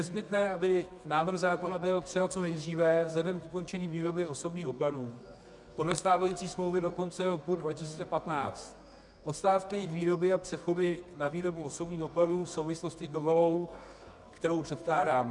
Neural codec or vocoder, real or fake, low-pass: codec, 24 kHz, 0.9 kbps, WavTokenizer, medium music audio release; fake; 10.8 kHz